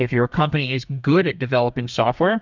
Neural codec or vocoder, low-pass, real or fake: codec, 32 kHz, 1.9 kbps, SNAC; 7.2 kHz; fake